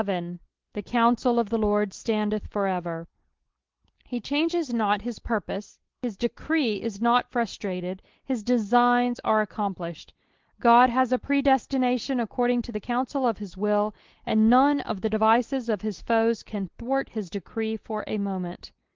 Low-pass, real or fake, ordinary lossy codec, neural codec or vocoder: 7.2 kHz; real; Opus, 16 kbps; none